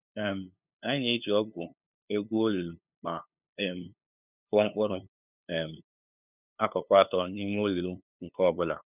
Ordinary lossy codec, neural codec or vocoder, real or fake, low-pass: none; codec, 16 kHz, 2 kbps, FunCodec, trained on LibriTTS, 25 frames a second; fake; 3.6 kHz